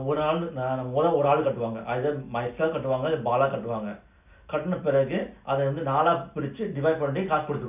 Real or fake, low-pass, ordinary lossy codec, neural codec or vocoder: real; 3.6 kHz; none; none